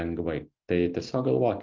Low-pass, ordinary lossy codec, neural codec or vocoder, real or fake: 7.2 kHz; Opus, 24 kbps; none; real